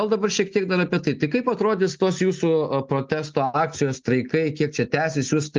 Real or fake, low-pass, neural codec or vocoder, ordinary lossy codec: real; 7.2 kHz; none; Opus, 32 kbps